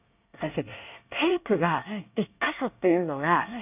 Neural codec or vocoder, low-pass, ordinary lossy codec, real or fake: codec, 24 kHz, 1 kbps, SNAC; 3.6 kHz; none; fake